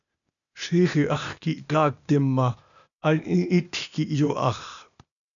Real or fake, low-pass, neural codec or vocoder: fake; 7.2 kHz; codec, 16 kHz, 0.8 kbps, ZipCodec